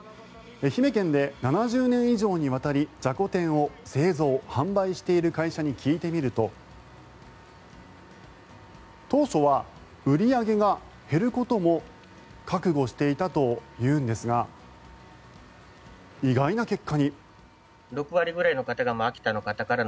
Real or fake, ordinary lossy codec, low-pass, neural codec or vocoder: real; none; none; none